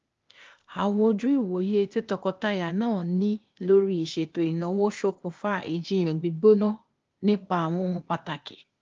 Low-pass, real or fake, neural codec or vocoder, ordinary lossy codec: 7.2 kHz; fake; codec, 16 kHz, 0.8 kbps, ZipCodec; Opus, 32 kbps